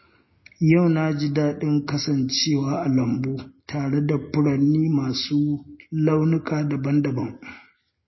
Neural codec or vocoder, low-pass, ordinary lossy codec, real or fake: none; 7.2 kHz; MP3, 24 kbps; real